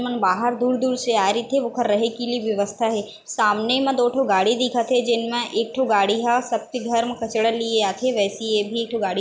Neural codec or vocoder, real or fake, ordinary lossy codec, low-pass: none; real; none; none